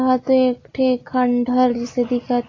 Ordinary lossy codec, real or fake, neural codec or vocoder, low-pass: AAC, 32 kbps; real; none; 7.2 kHz